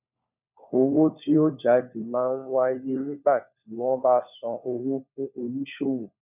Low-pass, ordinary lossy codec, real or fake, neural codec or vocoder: 3.6 kHz; none; fake; codec, 16 kHz, 4 kbps, FunCodec, trained on LibriTTS, 50 frames a second